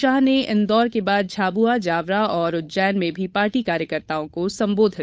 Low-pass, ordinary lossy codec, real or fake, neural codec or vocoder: none; none; fake; codec, 16 kHz, 8 kbps, FunCodec, trained on Chinese and English, 25 frames a second